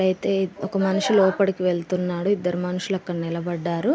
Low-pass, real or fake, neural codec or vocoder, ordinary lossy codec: none; real; none; none